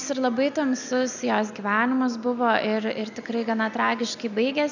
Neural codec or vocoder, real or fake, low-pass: none; real; 7.2 kHz